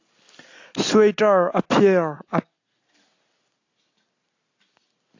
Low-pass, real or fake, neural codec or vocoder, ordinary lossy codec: 7.2 kHz; real; none; AAC, 48 kbps